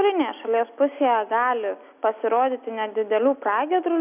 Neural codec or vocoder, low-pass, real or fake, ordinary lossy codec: none; 3.6 kHz; real; AAC, 32 kbps